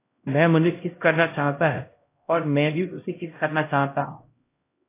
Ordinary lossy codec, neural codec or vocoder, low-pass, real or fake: AAC, 24 kbps; codec, 16 kHz, 0.5 kbps, X-Codec, HuBERT features, trained on LibriSpeech; 3.6 kHz; fake